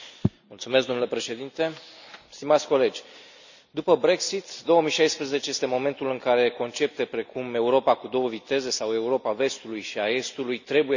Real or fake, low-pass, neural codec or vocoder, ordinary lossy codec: real; 7.2 kHz; none; none